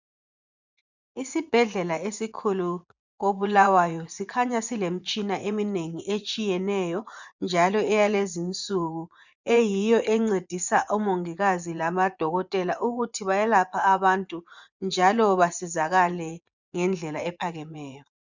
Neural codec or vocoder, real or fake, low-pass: vocoder, 24 kHz, 100 mel bands, Vocos; fake; 7.2 kHz